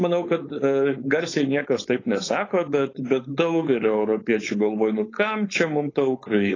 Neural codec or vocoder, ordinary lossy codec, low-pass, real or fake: codec, 16 kHz, 4.8 kbps, FACodec; AAC, 32 kbps; 7.2 kHz; fake